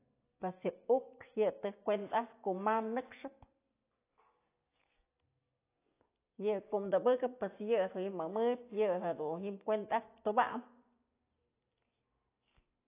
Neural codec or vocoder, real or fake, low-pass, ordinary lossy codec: none; real; 3.6 kHz; AAC, 24 kbps